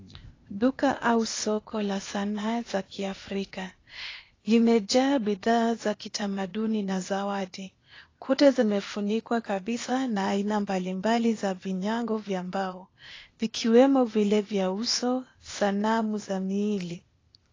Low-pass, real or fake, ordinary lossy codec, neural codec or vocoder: 7.2 kHz; fake; AAC, 32 kbps; codec, 16 kHz, 0.8 kbps, ZipCodec